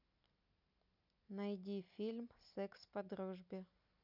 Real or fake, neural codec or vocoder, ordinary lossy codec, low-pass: real; none; none; 5.4 kHz